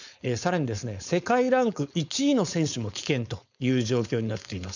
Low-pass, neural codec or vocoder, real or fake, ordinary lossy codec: 7.2 kHz; codec, 16 kHz, 4.8 kbps, FACodec; fake; none